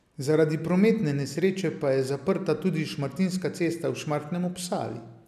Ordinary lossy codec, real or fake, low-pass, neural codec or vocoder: none; real; 14.4 kHz; none